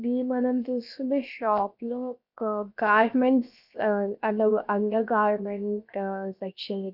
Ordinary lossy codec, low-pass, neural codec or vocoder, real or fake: none; 5.4 kHz; codec, 16 kHz, 0.7 kbps, FocalCodec; fake